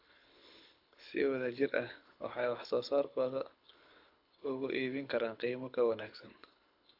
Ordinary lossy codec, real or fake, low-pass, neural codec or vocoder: AAC, 48 kbps; fake; 5.4 kHz; codec, 24 kHz, 6 kbps, HILCodec